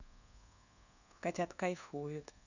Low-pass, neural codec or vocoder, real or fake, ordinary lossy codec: 7.2 kHz; codec, 24 kHz, 1.2 kbps, DualCodec; fake; none